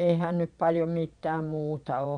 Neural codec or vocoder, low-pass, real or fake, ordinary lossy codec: vocoder, 22.05 kHz, 80 mel bands, Vocos; 9.9 kHz; fake; none